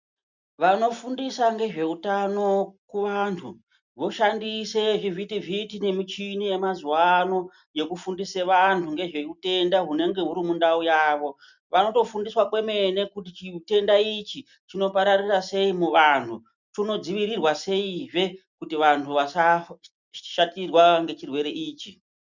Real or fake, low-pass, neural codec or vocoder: real; 7.2 kHz; none